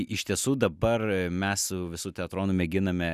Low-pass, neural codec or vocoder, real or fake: 14.4 kHz; none; real